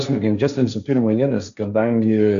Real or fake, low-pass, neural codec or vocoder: fake; 7.2 kHz; codec, 16 kHz, 1.1 kbps, Voila-Tokenizer